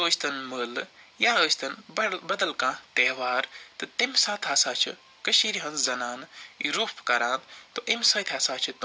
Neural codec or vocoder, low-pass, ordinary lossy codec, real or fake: none; none; none; real